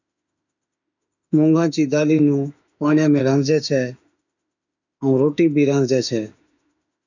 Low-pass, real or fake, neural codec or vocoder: 7.2 kHz; fake; autoencoder, 48 kHz, 32 numbers a frame, DAC-VAE, trained on Japanese speech